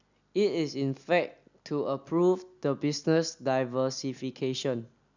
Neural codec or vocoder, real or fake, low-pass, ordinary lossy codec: none; real; 7.2 kHz; none